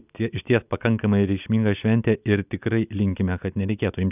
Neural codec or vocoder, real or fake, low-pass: none; real; 3.6 kHz